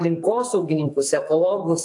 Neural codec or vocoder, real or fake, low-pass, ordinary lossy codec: codec, 44.1 kHz, 2.6 kbps, SNAC; fake; 10.8 kHz; AAC, 64 kbps